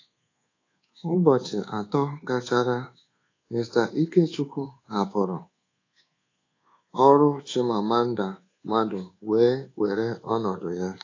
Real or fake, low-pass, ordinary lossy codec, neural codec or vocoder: fake; 7.2 kHz; AAC, 32 kbps; codec, 24 kHz, 1.2 kbps, DualCodec